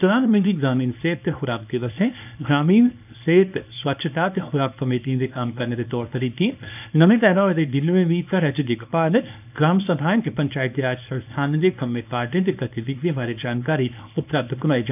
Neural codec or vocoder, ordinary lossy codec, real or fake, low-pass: codec, 24 kHz, 0.9 kbps, WavTokenizer, small release; none; fake; 3.6 kHz